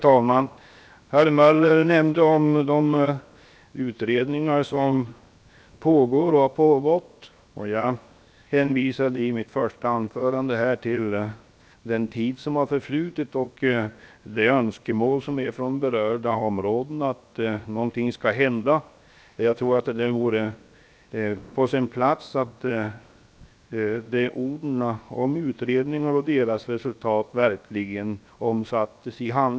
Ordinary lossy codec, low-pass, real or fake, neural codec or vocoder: none; none; fake; codec, 16 kHz, 0.7 kbps, FocalCodec